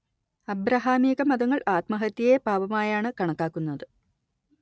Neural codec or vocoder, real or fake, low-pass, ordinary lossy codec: none; real; none; none